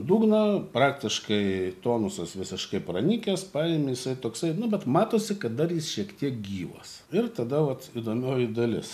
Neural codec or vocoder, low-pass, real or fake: none; 14.4 kHz; real